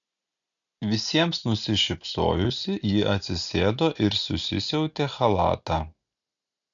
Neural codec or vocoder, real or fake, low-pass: none; real; 7.2 kHz